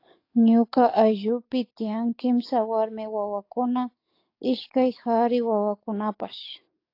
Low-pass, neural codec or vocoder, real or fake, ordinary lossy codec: 5.4 kHz; codec, 44.1 kHz, 7.8 kbps, DAC; fake; AAC, 32 kbps